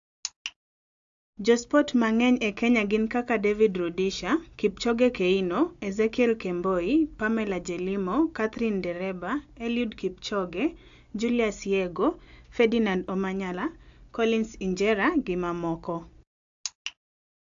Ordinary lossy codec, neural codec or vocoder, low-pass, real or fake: AAC, 64 kbps; none; 7.2 kHz; real